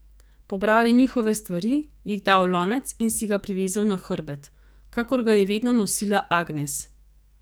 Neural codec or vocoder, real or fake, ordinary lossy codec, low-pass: codec, 44.1 kHz, 2.6 kbps, SNAC; fake; none; none